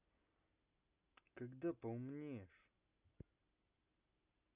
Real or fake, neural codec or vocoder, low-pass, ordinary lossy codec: real; none; 3.6 kHz; Opus, 64 kbps